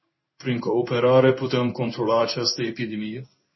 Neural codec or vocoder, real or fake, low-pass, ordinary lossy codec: codec, 16 kHz in and 24 kHz out, 1 kbps, XY-Tokenizer; fake; 7.2 kHz; MP3, 24 kbps